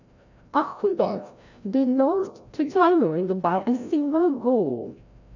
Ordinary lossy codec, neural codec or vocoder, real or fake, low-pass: none; codec, 16 kHz, 0.5 kbps, FreqCodec, larger model; fake; 7.2 kHz